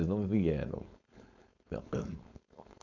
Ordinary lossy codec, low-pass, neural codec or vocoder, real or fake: none; 7.2 kHz; codec, 16 kHz, 4.8 kbps, FACodec; fake